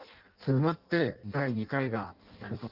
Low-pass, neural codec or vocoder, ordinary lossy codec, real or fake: 5.4 kHz; codec, 16 kHz in and 24 kHz out, 0.6 kbps, FireRedTTS-2 codec; Opus, 24 kbps; fake